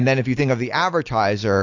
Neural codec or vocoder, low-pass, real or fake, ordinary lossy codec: none; 7.2 kHz; real; AAC, 48 kbps